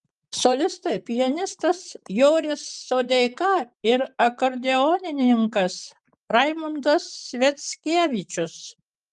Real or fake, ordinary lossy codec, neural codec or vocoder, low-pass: fake; Opus, 32 kbps; vocoder, 44.1 kHz, 128 mel bands, Pupu-Vocoder; 10.8 kHz